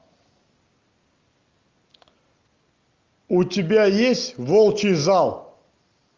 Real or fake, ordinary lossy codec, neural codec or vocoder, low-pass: real; Opus, 32 kbps; none; 7.2 kHz